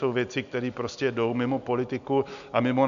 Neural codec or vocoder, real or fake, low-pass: none; real; 7.2 kHz